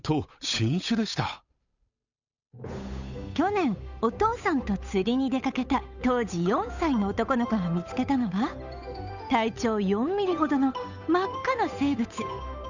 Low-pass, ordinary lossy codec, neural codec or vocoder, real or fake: 7.2 kHz; none; codec, 16 kHz, 8 kbps, FunCodec, trained on Chinese and English, 25 frames a second; fake